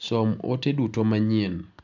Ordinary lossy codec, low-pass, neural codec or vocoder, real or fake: none; 7.2 kHz; none; real